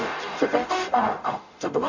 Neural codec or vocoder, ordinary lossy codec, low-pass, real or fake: codec, 44.1 kHz, 0.9 kbps, DAC; none; 7.2 kHz; fake